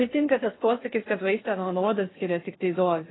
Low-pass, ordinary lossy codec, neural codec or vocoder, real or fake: 7.2 kHz; AAC, 16 kbps; codec, 16 kHz in and 24 kHz out, 0.6 kbps, FocalCodec, streaming, 4096 codes; fake